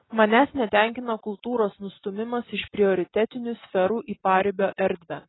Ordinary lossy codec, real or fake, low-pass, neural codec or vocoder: AAC, 16 kbps; real; 7.2 kHz; none